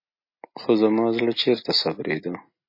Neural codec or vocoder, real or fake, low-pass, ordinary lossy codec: none; real; 5.4 kHz; MP3, 24 kbps